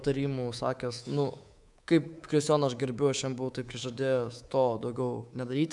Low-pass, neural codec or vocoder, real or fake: 10.8 kHz; codec, 24 kHz, 3.1 kbps, DualCodec; fake